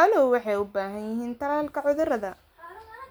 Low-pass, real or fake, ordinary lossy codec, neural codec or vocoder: none; real; none; none